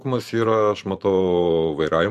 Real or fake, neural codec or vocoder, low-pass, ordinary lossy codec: fake; vocoder, 44.1 kHz, 128 mel bands every 512 samples, BigVGAN v2; 14.4 kHz; MP3, 64 kbps